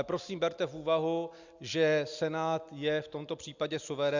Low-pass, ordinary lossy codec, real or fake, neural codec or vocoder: 7.2 kHz; Opus, 64 kbps; real; none